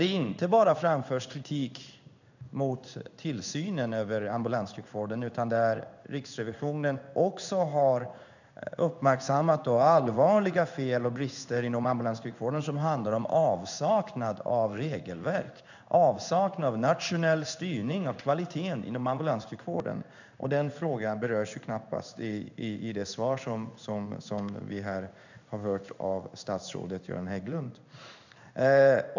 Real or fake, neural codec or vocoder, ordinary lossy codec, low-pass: fake; codec, 16 kHz in and 24 kHz out, 1 kbps, XY-Tokenizer; none; 7.2 kHz